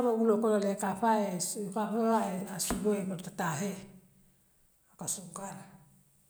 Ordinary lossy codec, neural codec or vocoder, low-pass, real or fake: none; none; none; real